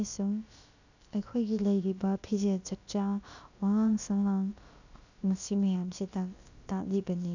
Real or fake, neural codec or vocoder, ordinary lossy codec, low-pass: fake; codec, 16 kHz, about 1 kbps, DyCAST, with the encoder's durations; none; 7.2 kHz